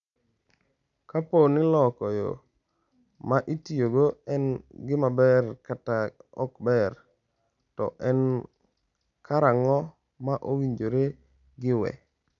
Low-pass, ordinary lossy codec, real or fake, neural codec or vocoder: 7.2 kHz; none; real; none